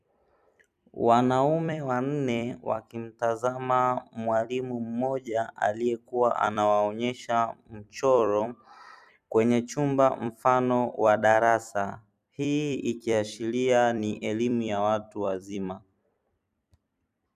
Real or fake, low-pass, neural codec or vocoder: fake; 14.4 kHz; vocoder, 44.1 kHz, 128 mel bands every 256 samples, BigVGAN v2